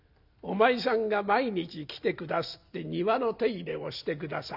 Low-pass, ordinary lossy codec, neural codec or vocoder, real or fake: 5.4 kHz; none; none; real